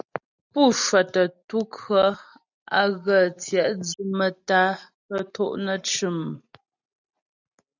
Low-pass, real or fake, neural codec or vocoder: 7.2 kHz; real; none